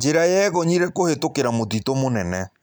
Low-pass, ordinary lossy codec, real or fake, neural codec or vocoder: none; none; real; none